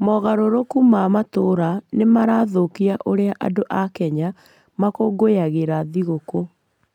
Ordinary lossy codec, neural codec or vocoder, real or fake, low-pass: none; none; real; 19.8 kHz